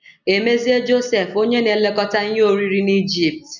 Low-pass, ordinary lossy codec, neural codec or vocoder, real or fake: 7.2 kHz; none; none; real